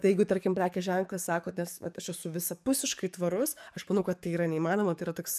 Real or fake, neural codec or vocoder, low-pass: fake; codec, 44.1 kHz, 7.8 kbps, DAC; 14.4 kHz